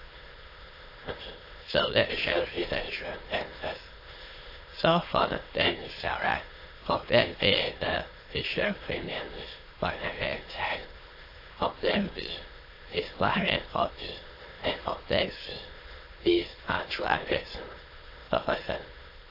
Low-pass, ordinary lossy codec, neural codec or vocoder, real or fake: 5.4 kHz; AAC, 32 kbps; autoencoder, 22.05 kHz, a latent of 192 numbers a frame, VITS, trained on many speakers; fake